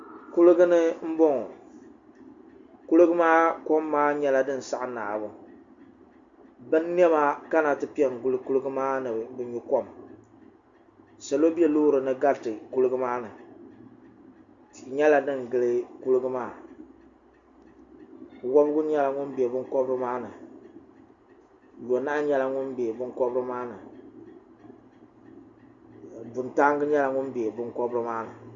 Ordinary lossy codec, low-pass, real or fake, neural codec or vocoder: Opus, 64 kbps; 7.2 kHz; real; none